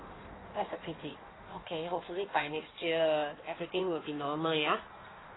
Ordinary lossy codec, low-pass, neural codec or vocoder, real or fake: AAC, 16 kbps; 7.2 kHz; codec, 16 kHz in and 24 kHz out, 1.1 kbps, FireRedTTS-2 codec; fake